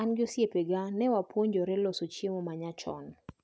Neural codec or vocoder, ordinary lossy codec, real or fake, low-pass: none; none; real; none